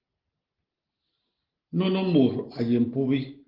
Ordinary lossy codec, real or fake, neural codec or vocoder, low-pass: Opus, 16 kbps; real; none; 5.4 kHz